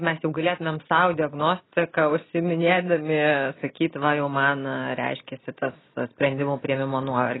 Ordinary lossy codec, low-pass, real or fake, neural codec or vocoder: AAC, 16 kbps; 7.2 kHz; real; none